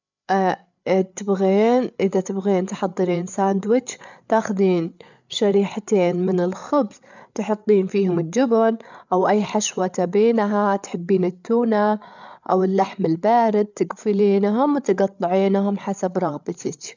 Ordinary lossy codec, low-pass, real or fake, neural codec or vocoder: none; 7.2 kHz; fake; codec, 16 kHz, 8 kbps, FreqCodec, larger model